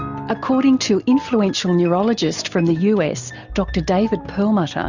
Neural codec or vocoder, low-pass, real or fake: none; 7.2 kHz; real